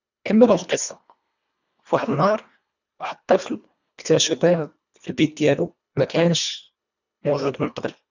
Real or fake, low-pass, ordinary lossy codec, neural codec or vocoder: fake; 7.2 kHz; none; codec, 24 kHz, 1.5 kbps, HILCodec